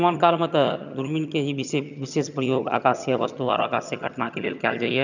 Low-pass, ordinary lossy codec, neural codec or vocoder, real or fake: 7.2 kHz; none; vocoder, 22.05 kHz, 80 mel bands, HiFi-GAN; fake